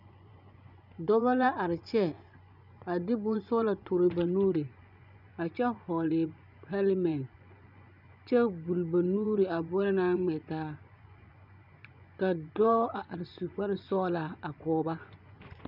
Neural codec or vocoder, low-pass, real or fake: vocoder, 24 kHz, 100 mel bands, Vocos; 5.4 kHz; fake